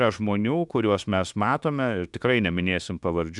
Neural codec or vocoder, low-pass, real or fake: autoencoder, 48 kHz, 32 numbers a frame, DAC-VAE, trained on Japanese speech; 10.8 kHz; fake